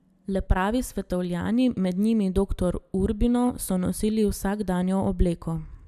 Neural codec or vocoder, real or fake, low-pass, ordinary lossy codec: none; real; 14.4 kHz; none